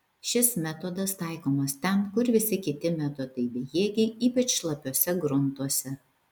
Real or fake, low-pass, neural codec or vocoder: real; 19.8 kHz; none